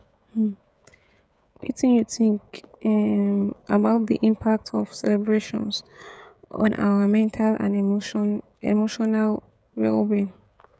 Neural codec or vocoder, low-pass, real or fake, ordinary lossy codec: codec, 16 kHz, 16 kbps, FreqCodec, smaller model; none; fake; none